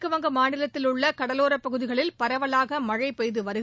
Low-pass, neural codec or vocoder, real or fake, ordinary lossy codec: none; none; real; none